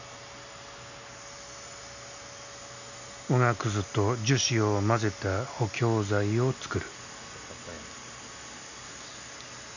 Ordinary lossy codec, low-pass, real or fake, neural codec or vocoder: none; 7.2 kHz; real; none